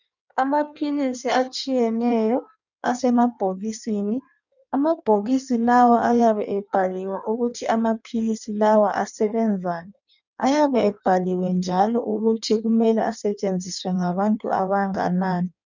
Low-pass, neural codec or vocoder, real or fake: 7.2 kHz; codec, 16 kHz in and 24 kHz out, 1.1 kbps, FireRedTTS-2 codec; fake